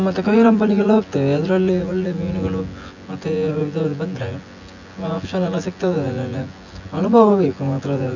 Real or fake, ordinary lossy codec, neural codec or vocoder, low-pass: fake; none; vocoder, 24 kHz, 100 mel bands, Vocos; 7.2 kHz